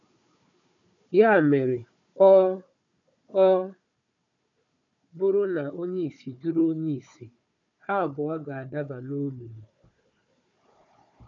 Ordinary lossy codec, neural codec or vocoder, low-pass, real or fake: none; codec, 16 kHz, 4 kbps, FunCodec, trained on Chinese and English, 50 frames a second; 7.2 kHz; fake